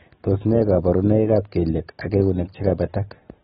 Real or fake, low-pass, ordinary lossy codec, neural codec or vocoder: real; 10.8 kHz; AAC, 16 kbps; none